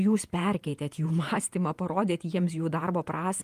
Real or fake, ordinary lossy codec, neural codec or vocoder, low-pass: fake; Opus, 32 kbps; vocoder, 48 kHz, 128 mel bands, Vocos; 14.4 kHz